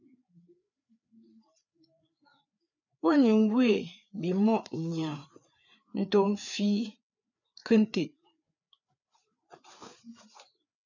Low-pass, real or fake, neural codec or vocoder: 7.2 kHz; fake; codec, 16 kHz, 4 kbps, FreqCodec, larger model